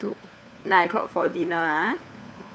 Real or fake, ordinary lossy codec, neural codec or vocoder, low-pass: fake; none; codec, 16 kHz, 4 kbps, FunCodec, trained on LibriTTS, 50 frames a second; none